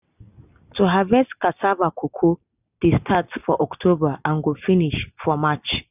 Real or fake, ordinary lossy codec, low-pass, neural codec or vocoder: real; none; 3.6 kHz; none